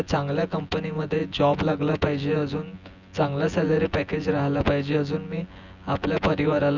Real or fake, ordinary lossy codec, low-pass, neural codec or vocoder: fake; Opus, 64 kbps; 7.2 kHz; vocoder, 24 kHz, 100 mel bands, Vocos